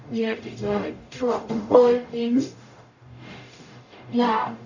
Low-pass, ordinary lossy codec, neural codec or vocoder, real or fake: 7.2 kHz; none; codec, 44.1 kHz, 0.9 kbps, DAC; fake